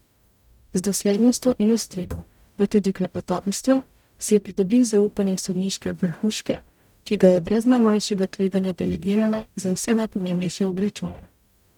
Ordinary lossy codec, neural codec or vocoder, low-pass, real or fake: none; codec, 44.1 kHz, 0.9 kbps, DAC; 19.8 kHz; fake